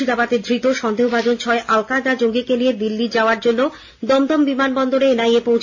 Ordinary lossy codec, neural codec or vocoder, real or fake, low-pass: AAC, 48 kbps; none; real; 7.2 kHz